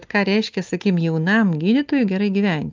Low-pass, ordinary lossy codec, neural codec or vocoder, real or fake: 7.2 kHz; Opus, 24 kbps; none; real